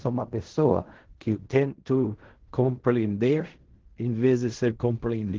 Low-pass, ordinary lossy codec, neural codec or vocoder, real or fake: 7.2 kHz; Opus, 16 kbps; codec, 16 kHz in and 24 kHz out, 0.4 kbps, LongCat-Audio-Codec, fine tuned four codebook decoder; fake